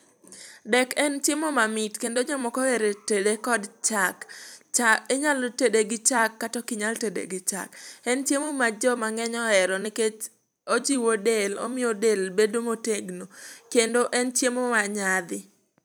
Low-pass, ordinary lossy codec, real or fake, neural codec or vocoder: none; none; fake; vocoder, 44.1 kHz, 128 mel bands every 256 samples, BigVGAN v2